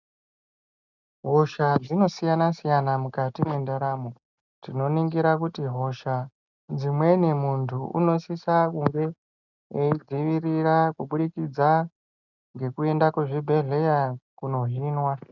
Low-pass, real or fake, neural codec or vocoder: 7.2 kHz; real; none